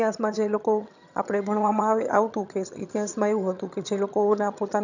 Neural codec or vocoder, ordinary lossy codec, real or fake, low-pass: vocoder, 22.05 kHz, 80 mel bands, HiFi-GAN; none; fake; 7.2 kHz